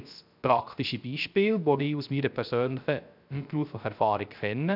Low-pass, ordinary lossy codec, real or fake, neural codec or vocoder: 5.4 kHz; none; fake; codec, 16 kHz, 0.3 kbps, FocalCodec